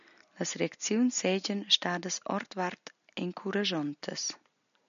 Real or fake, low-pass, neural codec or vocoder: real; 7.2 kHz; none